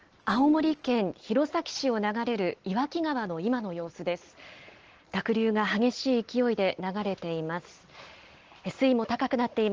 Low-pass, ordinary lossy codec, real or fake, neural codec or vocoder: 7.2 kHz; Opus, 16 kbps; real; none